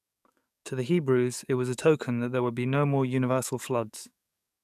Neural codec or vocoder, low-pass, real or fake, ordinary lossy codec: codec, 44.1 kHz, 7.8 kbps, DAC; 14.4 kHz; fake; none